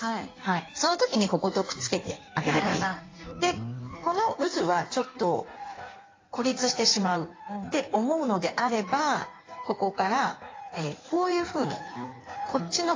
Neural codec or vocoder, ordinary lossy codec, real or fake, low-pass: codec, 16 kHz in and 24 kHz out, 1.1 kbps, FireRedTTS-2 codec; AAC, 32 kbps; fake; 7.2 kHz